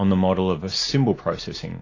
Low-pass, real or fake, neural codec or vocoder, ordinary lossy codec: 7.2 kHz; real; none; AAC, 32 kbps